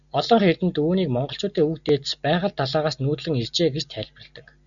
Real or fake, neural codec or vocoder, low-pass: real; none; 7.2 kHz